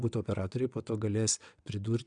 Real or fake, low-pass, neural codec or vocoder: fake; 9.9 kHz; vocoder, 22.05 kHz, 80 mel bands, WaveNeXt